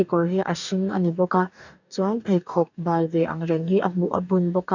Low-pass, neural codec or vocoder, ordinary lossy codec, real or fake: 7.2 kHz; codec, 44.1 kHz, 2.6 kbps, DAC; none; fake